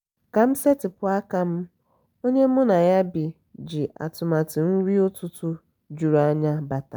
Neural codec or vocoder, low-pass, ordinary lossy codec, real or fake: none; none; none; real